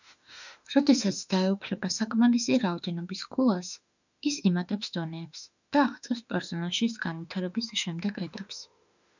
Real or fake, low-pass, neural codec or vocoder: fake; 7.2 kHz; autoencoder, 48 kHz, 32 numbers a frame, DAC-VAE, trained on Japanese speech